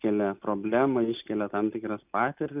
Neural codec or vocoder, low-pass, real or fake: none; 3.6 kHz; real